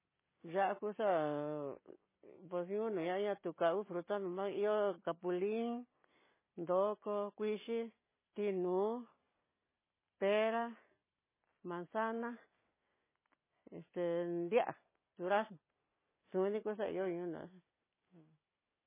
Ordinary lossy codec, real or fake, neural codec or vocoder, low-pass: MP3, 16 kbps; real; none; 3.6 kHz